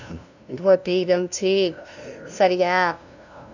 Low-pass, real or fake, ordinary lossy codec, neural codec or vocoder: 7.2 kHz; fake; none; codec, 16 kHz, 0.5 kbps, FunCodec, trained on LibriTTS, 25 frames a second